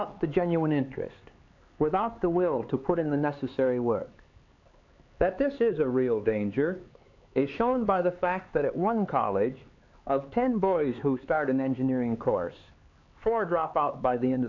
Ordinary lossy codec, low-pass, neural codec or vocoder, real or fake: AAC, 48 kbps; 7.2 kHz; codec, 16 kHz, 4 kbps, X-Codec, HuBERT features, trained on LibriSpeech; fake